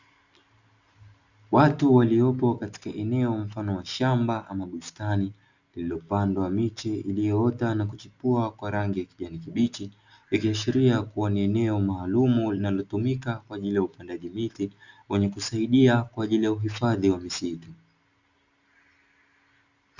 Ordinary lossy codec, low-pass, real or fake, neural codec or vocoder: Opus, 64 kbps; 7.2 kHz; real; none